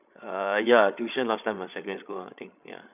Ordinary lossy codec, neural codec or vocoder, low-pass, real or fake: none; codec, 16 kHz, 16 kbps, FreqCodec, larger model; 3.6 kHz; fake